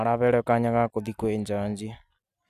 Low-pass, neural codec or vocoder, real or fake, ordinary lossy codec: 14.4 kHz; none; real; none